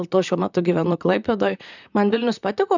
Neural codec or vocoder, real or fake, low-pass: vocoder, 44.1 kHz, 128 mel bands, Pupu-Vocoder; fake; 7.2 kHz